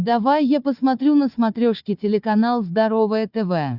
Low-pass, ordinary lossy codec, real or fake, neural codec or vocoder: 5.4 kHz; Opus, 64 kbps; fake; codec, 16 kHz, 4 kbps, FunCodec, trained on Chinese and English, 50 frames a second